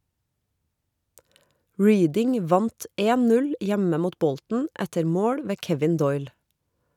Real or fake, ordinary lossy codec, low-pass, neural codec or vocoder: real; none; 19.8 kHz; none